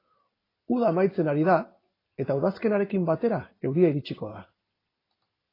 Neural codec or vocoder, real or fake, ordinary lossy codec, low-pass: none; real; AAC, 24 kbps; 5.4 kHz